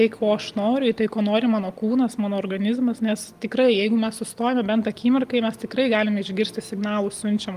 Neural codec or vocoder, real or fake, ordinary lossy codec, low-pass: none; real; Opus, 32 kbps; 14.4 kHz